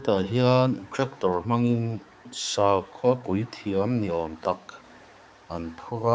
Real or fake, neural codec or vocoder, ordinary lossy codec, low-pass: fake; codec, 16 kHz, 4 kbps, X-Codec, HuBERT features, trained on balanced general audio; none; none